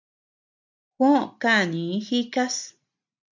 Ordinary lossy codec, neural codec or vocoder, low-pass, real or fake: MP3, 64 kbps; none; 7.2 kHz; real